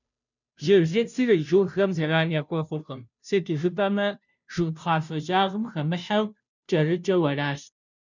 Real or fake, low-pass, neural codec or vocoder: fake; 7.2 kHz; codec, 16 kHz, 0.5 kbps, FunCodec, trained on Chinese and English, 25 frames a second